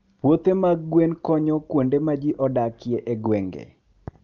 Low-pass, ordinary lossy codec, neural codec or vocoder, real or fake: 7.2 kHz; Opus, 32 kbps; none; real